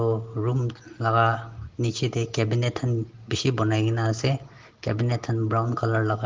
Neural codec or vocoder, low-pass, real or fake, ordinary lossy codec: none; 7.2 kHz; real; Opus, 16 kbps